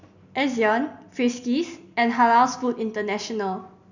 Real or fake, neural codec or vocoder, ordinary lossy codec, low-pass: real; none; none; 7.2 kHz